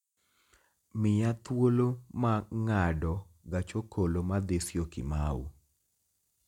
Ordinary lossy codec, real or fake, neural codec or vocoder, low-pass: none; real; none; 19.8 kHz